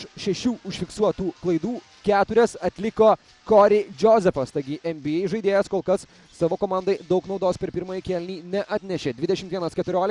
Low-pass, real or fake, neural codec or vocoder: 10.8 kHz; real; none